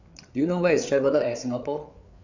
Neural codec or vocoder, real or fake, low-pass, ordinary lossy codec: codec, 16 kHz, 8 kbps, FreqCodec, larger model; fake; 7.2 kHz; none